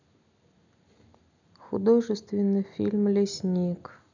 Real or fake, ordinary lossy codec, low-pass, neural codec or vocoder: real; none; 7.2 kHz; none